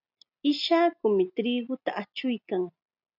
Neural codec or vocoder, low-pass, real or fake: none; 5.4 kHz; real